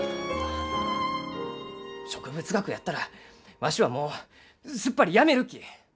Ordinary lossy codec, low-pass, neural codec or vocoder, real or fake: none; none; none; real